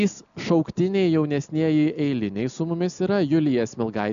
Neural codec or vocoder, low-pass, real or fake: none; 7.2 kHz; real